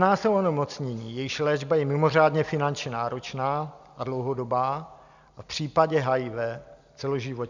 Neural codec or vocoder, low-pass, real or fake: none; 7.2 kHz; real